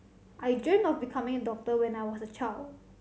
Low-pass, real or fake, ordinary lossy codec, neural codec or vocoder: none; real; none; none